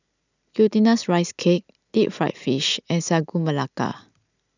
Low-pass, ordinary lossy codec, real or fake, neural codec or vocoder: 7.2 kHz; none; real; none